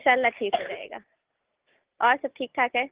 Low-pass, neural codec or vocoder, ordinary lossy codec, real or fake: 3.6 kHz; none; Opus, 16 kbps; real